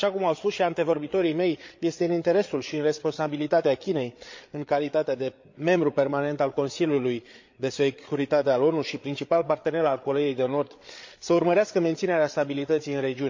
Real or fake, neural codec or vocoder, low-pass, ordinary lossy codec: fake; codec, 16 kHz, 8 kbps, FreqCodec, larger model; 7.2 kHz; MP3, 48 kbps